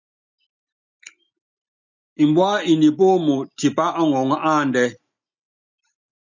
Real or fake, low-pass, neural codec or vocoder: real; 7.2 kHz; none